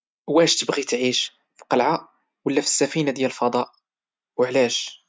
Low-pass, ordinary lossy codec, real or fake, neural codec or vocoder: none; none; real; none